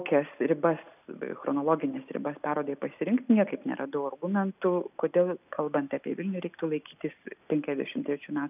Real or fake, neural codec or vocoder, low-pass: real; none; 3.6 kHz